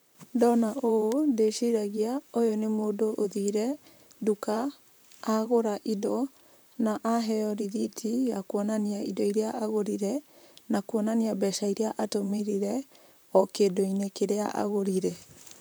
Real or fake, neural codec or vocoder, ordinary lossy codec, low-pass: fake; vocoder, 44.1 kHz, 128 mel bands, Pupu-Vocoder; none; none